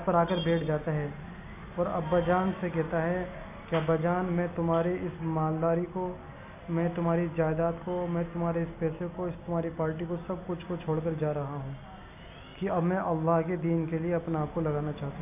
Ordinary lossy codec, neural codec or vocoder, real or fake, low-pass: AAC, 32 kbps; none; real; 3.6 kHz